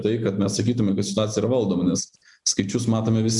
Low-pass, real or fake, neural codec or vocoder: 10.8 kHz; real; none